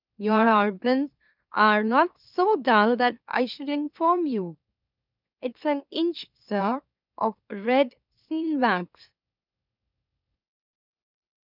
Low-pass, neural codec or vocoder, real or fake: 5.4 kHz; autoencoder, 44.1 kHz, a latent of 192 numbers a frame, MeloTTS; fake